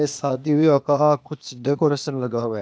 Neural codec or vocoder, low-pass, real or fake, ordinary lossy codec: codec, 16 kHz, 0.8 kbps, ZipCodec; none; fake; none